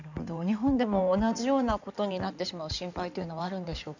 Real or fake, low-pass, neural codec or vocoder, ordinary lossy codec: fake; 7.2 kHz; codec, 16 kHz in and 24 kHz out, 2.2 kbps, FireRedTTS-2 codec; none